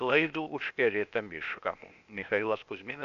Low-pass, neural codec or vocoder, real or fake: 7.2 kHz; codec, 16 kHz, 0.8 kbps, ZipCodec; fake